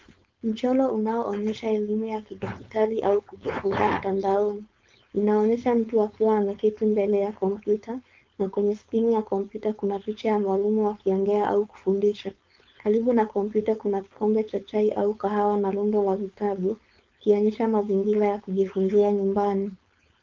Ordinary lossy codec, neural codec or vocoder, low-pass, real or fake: Opus, 32 kbps; codec, 16 kHz, 4.8 kbps, FACodec; 7.2 kHz; fake